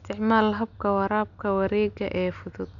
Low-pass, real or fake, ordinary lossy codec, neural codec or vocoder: 7.2 kHz; real; none; none